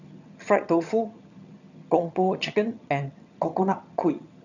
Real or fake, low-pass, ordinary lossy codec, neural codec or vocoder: fake; 7.2 kHz; none; vocoder, 22.05 kHz, 80 mel bands, HiFi-GAN